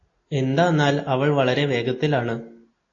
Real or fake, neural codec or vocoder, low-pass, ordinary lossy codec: real; none; 7.2 kHz; AAC, 32 kbps